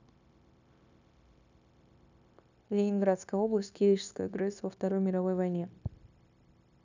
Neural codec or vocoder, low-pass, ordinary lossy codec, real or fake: codec, 16 kHz, 0.9 kbps, LongCat-Audio-Codec; 7.2 kHz; none; fake